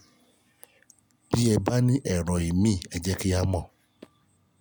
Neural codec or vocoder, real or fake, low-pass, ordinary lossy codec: none; real; none; none